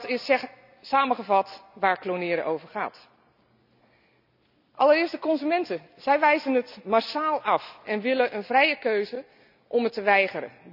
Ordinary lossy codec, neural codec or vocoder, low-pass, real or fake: none; none; 5.4 kHz; real